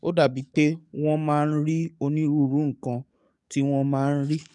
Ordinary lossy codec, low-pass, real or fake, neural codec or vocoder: none; 10.8 kHz; fake; codec, 44.1 kHz, 7.8 kbps, DAC